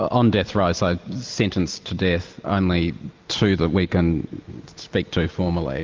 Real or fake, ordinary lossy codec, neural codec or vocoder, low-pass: real; Opus, 24 kbps; none; 7.2 kHz